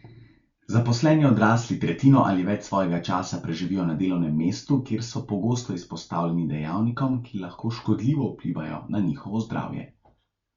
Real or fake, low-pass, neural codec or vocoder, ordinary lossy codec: real; 7.2 kHz; none; Opus, 64 kbps